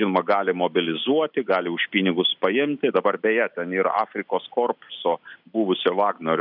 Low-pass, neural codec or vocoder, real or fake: 5.4 kHz; none; real